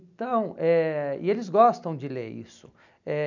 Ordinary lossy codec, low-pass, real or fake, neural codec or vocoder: none; 7.2 kHz; real; none